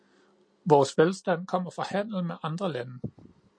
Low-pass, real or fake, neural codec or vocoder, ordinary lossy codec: 9.9 kHz; real; none; MP3, 48 kbps